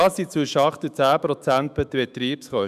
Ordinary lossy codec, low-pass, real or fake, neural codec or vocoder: none; 14.4 kHz; fake; autoencoder, 48 kHz, 128 numbers a frame, DAC-VAE, trained on Japanese speech